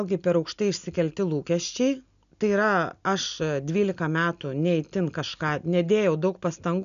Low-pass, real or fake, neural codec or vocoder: 7.2 kHz; real; none